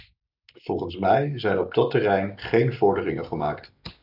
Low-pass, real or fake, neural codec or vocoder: 5.4 kHz; real; none